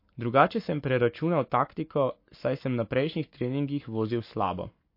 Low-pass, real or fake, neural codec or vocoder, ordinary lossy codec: 5.4 kHz; fake; codec, 44.1 kHz, 7.8 kbps, Pupu-Codec; MP3, 32 kbps